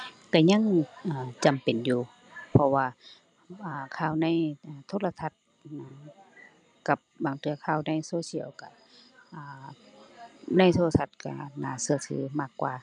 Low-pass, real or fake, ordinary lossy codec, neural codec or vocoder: 9.9 kHz; real; none; none